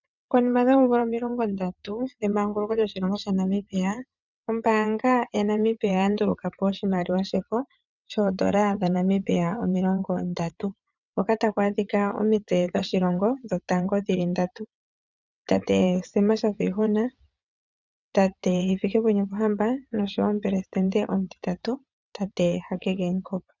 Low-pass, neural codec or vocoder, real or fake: 7.2 kHz; vocoder, 22.05 kHz, 80 mel bands, WaveNeXt; fake